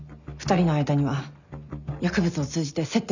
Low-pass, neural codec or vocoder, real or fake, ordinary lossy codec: 7.2 kHz; none; real; none